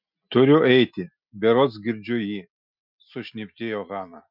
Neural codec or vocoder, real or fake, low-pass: none; real; 5.4 kHz